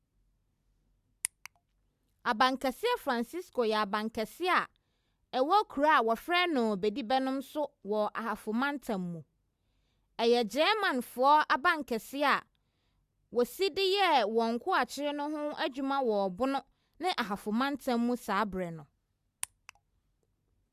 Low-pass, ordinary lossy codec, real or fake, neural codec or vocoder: 14.4 kHz; Opus, 64 kbps; real; none